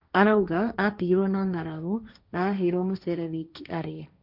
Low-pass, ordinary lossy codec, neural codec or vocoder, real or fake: 5.4 kHz; none; codec, 16 kHz, 1.1 kbps, Voila-Tokenizer; fake